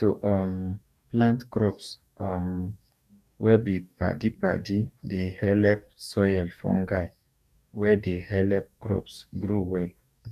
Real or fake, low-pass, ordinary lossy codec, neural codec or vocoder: fake; 14.4 kHz; none; codec, 44.1 kHz, 2.6 kbps, DAC